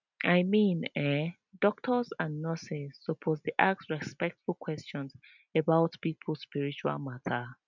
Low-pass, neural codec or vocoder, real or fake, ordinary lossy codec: 7.2 kHz; none; real; none